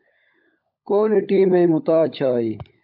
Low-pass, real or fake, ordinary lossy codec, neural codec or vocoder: 5.4 kHz; fake; AAC, 48 kbps; codec, 16 kHz, 16 kbps, FunCodec, trained on LibriTTS, 50 frames a second